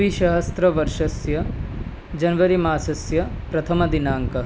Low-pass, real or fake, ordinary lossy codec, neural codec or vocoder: none; real; none; none